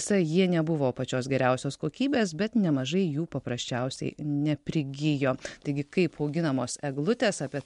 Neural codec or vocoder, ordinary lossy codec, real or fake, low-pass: none; MP3, 64 kbps; real; 10.8 kHz